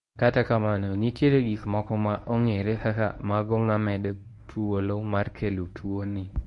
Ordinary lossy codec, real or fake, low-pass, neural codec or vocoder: MP3, 48 kbps; fake; 10.8 kHz; codec, 24 kHz, 0.9 kbps, WavTokenizer, medium speech release version 1